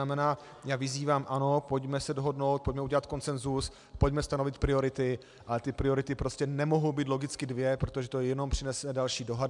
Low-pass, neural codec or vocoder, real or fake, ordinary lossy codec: 10.8 kHz; none; real; MP3, 96 kbps